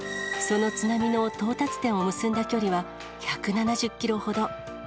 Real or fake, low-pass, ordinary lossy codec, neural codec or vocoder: real; none; none; none